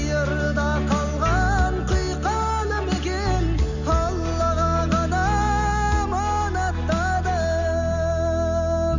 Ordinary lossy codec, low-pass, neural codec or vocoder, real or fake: none; 7.2 kHz; none; real